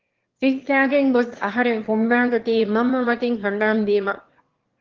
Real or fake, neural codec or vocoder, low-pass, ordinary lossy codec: fake; autoencoder, 22.05 kHz, a latent of 192 numbers a frame, VITS, trained on one speaker; 7.2 kHz; Opus, 16 kbps